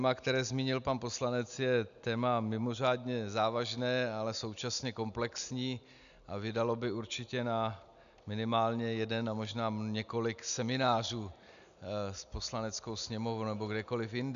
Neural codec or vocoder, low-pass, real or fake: none; 7.2 kHz; real